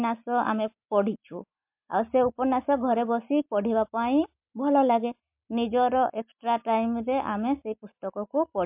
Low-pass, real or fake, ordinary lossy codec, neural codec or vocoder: 3.6 kHz; real; MP3, 32 kbps; none